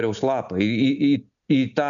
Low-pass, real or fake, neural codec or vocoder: 7.2 kHz; real; none